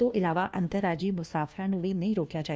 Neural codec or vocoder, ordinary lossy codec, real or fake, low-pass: codec, 16 kHz, 1 kbps, FunCodec, trained on LibriTTS, 50 frames a second; none; fake; none